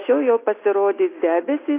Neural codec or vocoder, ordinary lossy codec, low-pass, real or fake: none; AAC, 24 kbps; 3.6 kHz; real